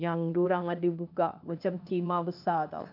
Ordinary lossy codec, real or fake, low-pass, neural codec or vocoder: none; fake; 5.4 kHz; codec, 16 kHz, 0.8 kbps, ZipCodec